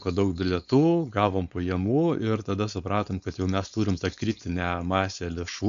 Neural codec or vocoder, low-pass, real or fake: codec, 16 kHz, 4.8 kbps, FACodec; 7.2 kHz; fake